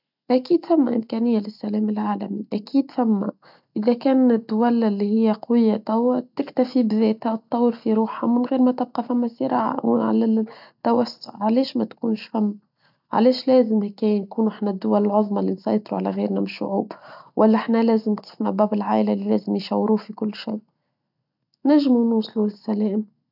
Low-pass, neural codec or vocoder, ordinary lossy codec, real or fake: 5.4 kHz; none; none; real